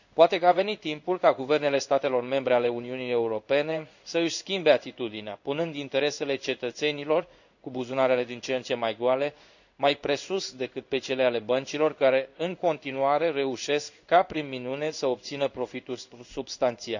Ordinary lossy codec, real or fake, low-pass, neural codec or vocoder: none; fake; 7.2 kHz; codec, 16 kHz in and 24 kHz out, 1 kbps, XY-Tokenizer